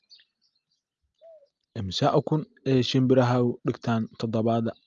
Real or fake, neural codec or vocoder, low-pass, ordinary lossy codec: real; none; 7.2 kHz; Opus, 32 kbps